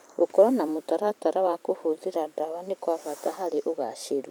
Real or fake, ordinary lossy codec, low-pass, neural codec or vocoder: fake; none; none; vocoder, 44.1 kHz, 128 mel bands every 512 samples, BigVGAN v2